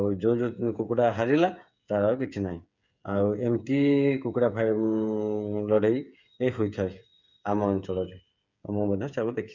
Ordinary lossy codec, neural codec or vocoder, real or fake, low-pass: none; codec, 16 kHz, 8 kbps, FreqCodec, smaller model; fake; 7.2 kHz